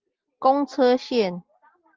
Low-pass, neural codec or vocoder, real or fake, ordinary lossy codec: 7.2 kHz; none; real; Opus, 32 kbps